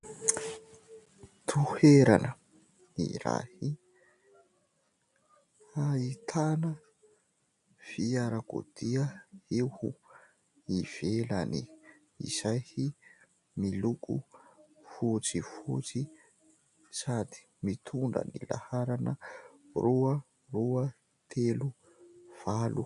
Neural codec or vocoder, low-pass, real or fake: none; 10.8 kHz; real